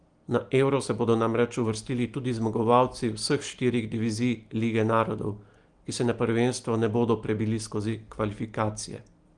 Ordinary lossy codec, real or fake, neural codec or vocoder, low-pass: Opus, 24 kbps; real; none; 9.9 kHz